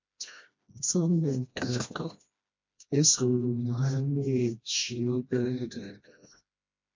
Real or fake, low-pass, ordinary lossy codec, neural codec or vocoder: fake; 7.2 kHz; MP3, 48 kbps; codec, 16 kHz, 1 kbps, FreqCodec, smaller model